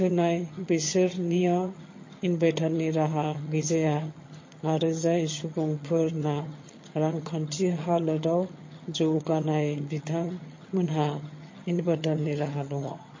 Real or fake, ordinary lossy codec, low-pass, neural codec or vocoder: fake; MP3, 32 kbps; 7.2 kHz; vocoder, 22.05 kHz, 80 mel bands, HiFi-GAN